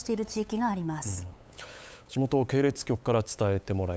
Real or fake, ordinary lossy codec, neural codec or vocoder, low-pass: fake; none; codec, 16 kHz, 8 kbps, FunCodec, trained on LibriTTS, 25 frames a second; none